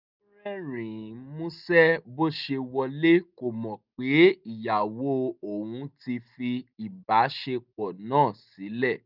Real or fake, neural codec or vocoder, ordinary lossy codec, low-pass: real; none; none; 5.4 kHz